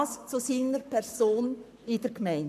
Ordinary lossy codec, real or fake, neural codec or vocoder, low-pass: none; fake; codec, 44.1 kHz, 7.8 kbps, Pupu-Codec; 14.4 kHz